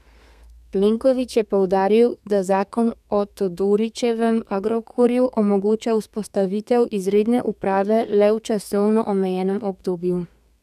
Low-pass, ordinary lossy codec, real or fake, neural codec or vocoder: 14.4 kHz; none; fake; codec, 32 kHz, 1.9 kbps, SNAC